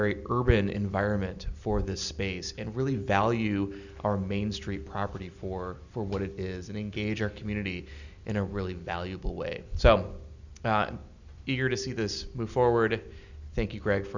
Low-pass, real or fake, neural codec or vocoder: 7.2 kHz; real; none